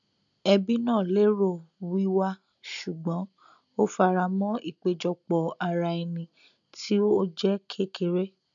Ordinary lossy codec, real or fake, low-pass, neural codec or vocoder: none; real; 7.2 kHz; none